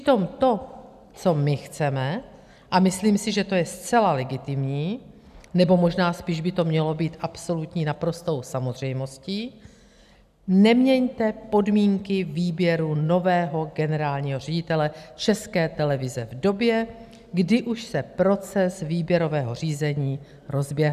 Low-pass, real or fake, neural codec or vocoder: 14.4 kHz; real; none